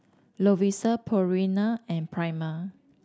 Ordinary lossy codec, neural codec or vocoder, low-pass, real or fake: none; none; none; real